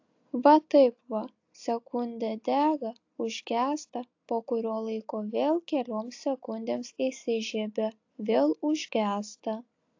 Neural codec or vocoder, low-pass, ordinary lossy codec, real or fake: none; 7.2 kHz; AAC, 48 kbps; real